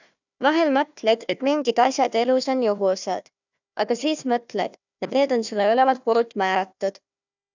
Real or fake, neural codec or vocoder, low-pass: fake; codec, 16 kHz, 1 kbps, FunCodec, trained on Chinese and English, 50 frames a second; 7.2 kHz